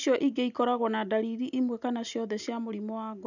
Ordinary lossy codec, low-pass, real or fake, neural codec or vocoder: none; 7.2 kHz; real; none